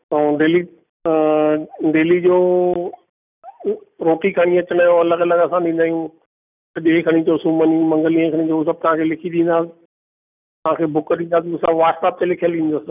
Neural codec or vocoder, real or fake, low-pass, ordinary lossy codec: none; real; 3.6 kHz; none